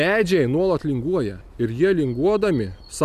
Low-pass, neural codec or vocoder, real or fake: 14.4 kHz; none; real